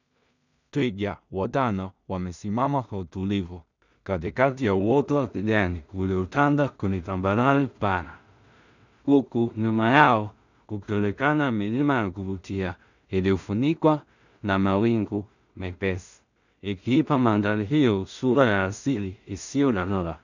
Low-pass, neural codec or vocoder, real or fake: 7.2 kHz; codec, 16 kHz in and 24 kHz out, 0.4 kbps, LongCat-Audio-Codec, two codebook decoder; fake